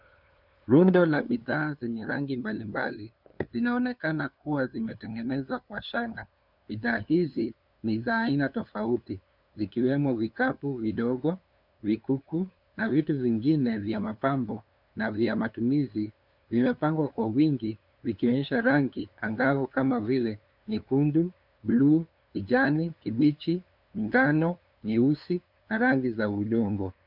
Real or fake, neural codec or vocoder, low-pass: fake; codec, 16 kHz, 2 kbps, FunCodec, trained on LibriTTS, 25 frames a second; 5.4 kHz